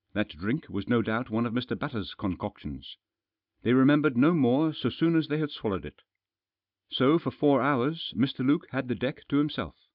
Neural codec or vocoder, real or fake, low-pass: none; real; 5.4 kHz